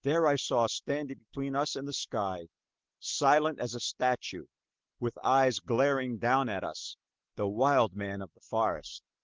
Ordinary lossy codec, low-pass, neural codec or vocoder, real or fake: Opus, 16 kbps; 7.2 kHz; none; real